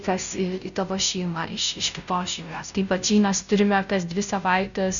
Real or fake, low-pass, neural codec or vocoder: fake; 7.2 kHz; codec, 16 kHz, 0.5 kbps, FunCodec, trained on Chinese and English, 25 frames a second